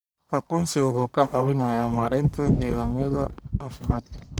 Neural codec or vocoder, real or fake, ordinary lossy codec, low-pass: codec, 44.1 kHz, 1.7 kbps, Pupu-Codec; fake; none; none